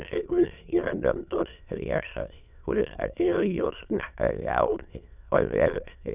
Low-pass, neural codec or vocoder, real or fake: 3.6 kHz; autoencoder, 22.05 kHz, a latent of 192 numbers a frame, VITS, trained on many speakers; fake